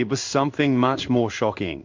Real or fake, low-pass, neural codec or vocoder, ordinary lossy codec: fake; 7.2 kHz; codec, 16 kHz in and 24 kHz out, 1 kbps, XY-Tokenizer; MP3, 64 kbps